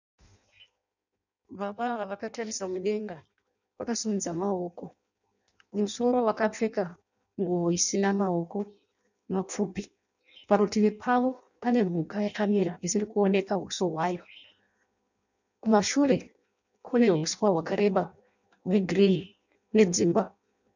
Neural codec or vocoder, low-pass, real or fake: codec, 16 kHz in and 24 kHz out, 0.6 kbps, FireRedTTS-2 codec; 7.2 kHz; fake